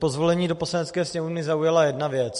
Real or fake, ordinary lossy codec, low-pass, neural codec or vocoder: real; MP3, 48 kbps; 14.4 kHz; none